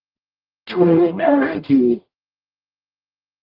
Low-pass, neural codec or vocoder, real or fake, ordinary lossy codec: 5.4 kHz; codec, 44.1 kHz, 0.9 kbps, DAC; fake; Opus, 32 kbps